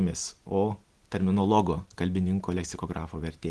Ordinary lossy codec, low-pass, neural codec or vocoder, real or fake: Opus, 16 kbps; 10.8 kHz; none; real